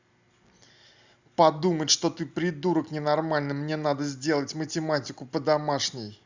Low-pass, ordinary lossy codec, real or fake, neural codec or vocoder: 7.2 kHz; Opus, 64 kbps; real; none